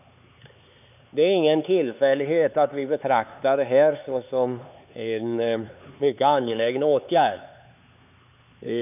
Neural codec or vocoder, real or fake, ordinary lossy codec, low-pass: codec, 16 kHz, 4 kbps, X-Codec, HuBERT features, trained on LibriSpeech; fake; none; 3.6 kHz